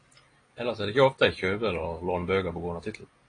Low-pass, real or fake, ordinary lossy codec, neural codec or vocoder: 9.9 kHz; real; AAC, 32 kbps; none